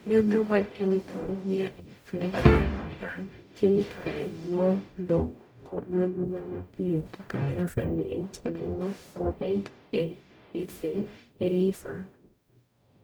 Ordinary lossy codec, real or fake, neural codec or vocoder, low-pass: none; fake; codec, 44.1 kHz, 0.9 kbps, DAC; none